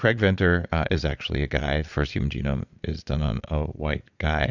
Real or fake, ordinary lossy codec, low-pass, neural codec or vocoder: fake; Opus, 64 kbps; 7.2 kHz; vocoder, 22.05 kHz, 80 mel bands, Vocos